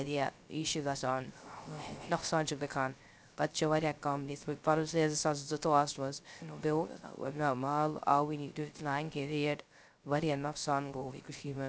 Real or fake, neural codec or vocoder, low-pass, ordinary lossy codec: fake; codec, 16 kHz, 0.3 kbps, FocalCodec; none; none